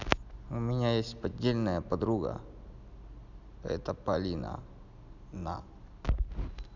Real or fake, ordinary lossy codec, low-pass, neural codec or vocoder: real; none; 7.2 kHz; none